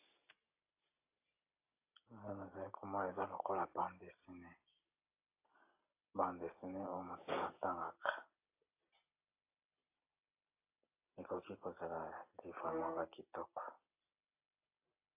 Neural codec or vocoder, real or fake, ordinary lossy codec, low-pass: none; real; AAC, 32 kbps; 3.6 kHz